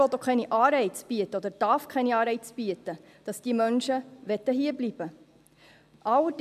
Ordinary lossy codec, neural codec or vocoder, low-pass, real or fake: MP3, 96 kbps; none; 14.4 kHz; real